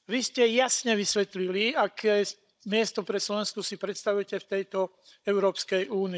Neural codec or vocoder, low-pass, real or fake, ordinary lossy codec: codec, 16 kHz, 16 kbps, FunCodec, trained on Chinese and English, 50 frames a second; none; fake; none